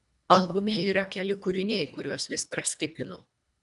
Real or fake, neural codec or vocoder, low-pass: fake; codec, 24 kHz, 1.5 kbps, HILCodec; 10.8 kHz